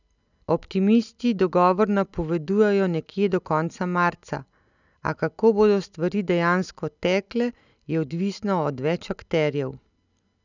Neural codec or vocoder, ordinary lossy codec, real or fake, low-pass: none; none; real; 7.2 kHz